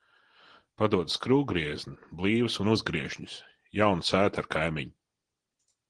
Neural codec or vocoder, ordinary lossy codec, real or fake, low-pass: none; Opus, 16 kbps; real; 9.9 kHz